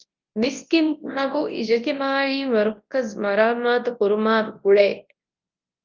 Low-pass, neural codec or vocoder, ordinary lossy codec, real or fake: 7.2 kHz; codec, 24 kHz, 0.9 kbps, WavTokenizer, large speech release; Opus, 32 kbps; fake